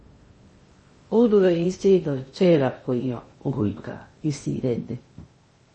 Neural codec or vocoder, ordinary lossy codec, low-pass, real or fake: codec, 16 kHz in and 24 kHz out, 0.6 kbps, FocalCodec, streaming, 2048 codes; MP3, 32 kbps; 10.8 kHz; fake